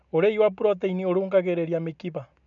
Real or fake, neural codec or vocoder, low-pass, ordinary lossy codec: real; none; 7.2 kHz; none